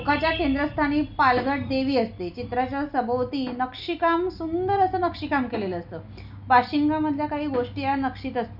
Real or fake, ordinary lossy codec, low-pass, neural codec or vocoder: real; none; 5.4 kHz; none